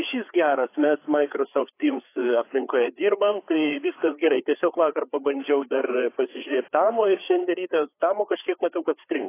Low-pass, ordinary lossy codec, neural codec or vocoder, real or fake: 3.6 kHz; AAC, 24 kbps; codec, 16 kHz, 4 kbps, FreqCodec, larger model; fake